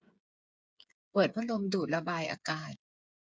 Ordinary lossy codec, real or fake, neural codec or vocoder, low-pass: none; fake; codec, 16 kHz, 8 kbps, FreqCodec, smaller model; none